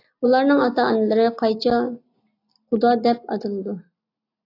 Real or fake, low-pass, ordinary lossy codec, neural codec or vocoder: real; 5.4 kHz; AAC, 32 kbps; none